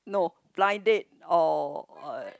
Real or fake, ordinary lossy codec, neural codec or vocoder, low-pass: real; none; none; none